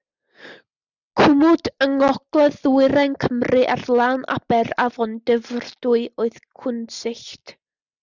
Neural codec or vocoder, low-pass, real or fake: none; 7.2 kHz; real